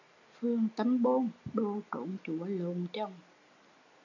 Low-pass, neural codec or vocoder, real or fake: 7.2 kHz; none; real